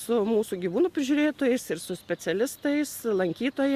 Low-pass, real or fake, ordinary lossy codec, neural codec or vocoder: 14.4 kHz; real; Opus, 64 kbps; none